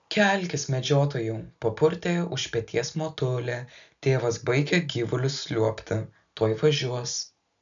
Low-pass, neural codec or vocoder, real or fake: 7.2 kHz; none; real